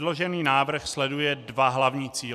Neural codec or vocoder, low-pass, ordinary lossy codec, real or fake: none; 14.4 kHz; MP3, 96 kbps; real